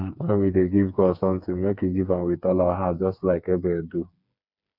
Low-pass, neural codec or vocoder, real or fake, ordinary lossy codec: 5.4 kHz; codec, 16 kHz, 4 kbps, FreqCodec, smaller model; fake; none